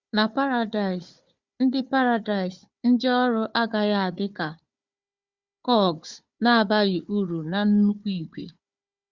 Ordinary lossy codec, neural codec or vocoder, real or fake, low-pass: Opus, 64 kbps; codec, 16 kHz, 4 kbps, FunCodec, trained on Chinese and English, 50 frames a second; fake; 7.2 kHz